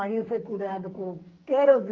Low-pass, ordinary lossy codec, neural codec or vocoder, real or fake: 7.2 kHz; Opus, 24 kbps; codec, 44.1 kHz, 2.6 kbps, SNAC; fake